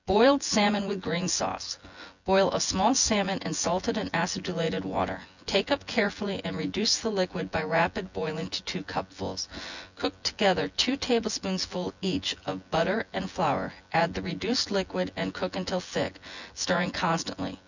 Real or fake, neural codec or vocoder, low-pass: fake; vocoder, 24 kHz, 100 mel bands, Vocos; 7.2 kHz